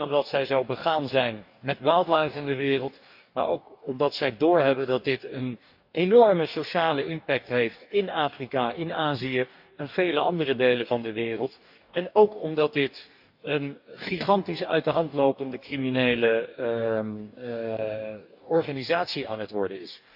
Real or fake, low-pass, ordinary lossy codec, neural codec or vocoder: fake; 5.4 kHz; AAC, 48 kbps; codec, 44.1 kHz, 2.6 kbps, DAC